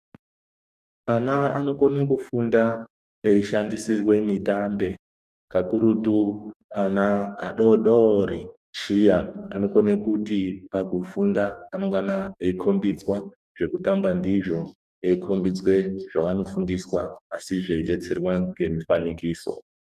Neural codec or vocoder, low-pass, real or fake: codec, 44.1 kHz, 2.6 kbps, DAC; 14.4 kHz; fake